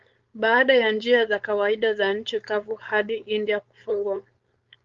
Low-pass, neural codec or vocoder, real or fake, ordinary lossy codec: 7.2 kHz; codec, 16 kHz, 4.8 kbps, FACodec; fake; Opus, 32 kbps